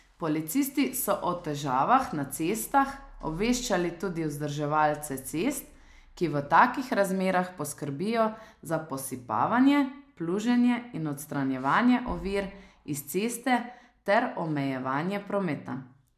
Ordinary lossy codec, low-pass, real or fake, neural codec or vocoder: MP3, 96 kbps; 14.4 kHz; real; none